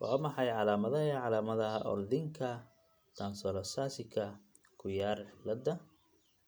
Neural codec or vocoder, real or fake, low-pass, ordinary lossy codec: none; real; none; none